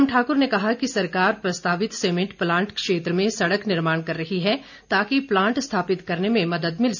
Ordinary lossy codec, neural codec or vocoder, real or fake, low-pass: none; none; real; 7.2 kHz